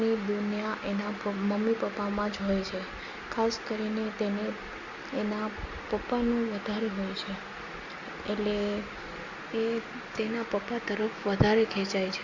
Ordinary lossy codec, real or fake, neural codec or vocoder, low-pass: Opus, 64 kbps; real; none; 7.2 kHz